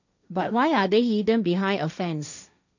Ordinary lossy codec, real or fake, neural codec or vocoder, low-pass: none; fake; codec, 16 kHz, 1.1 kbps, Voila-Tokenizer; 7.2 kHz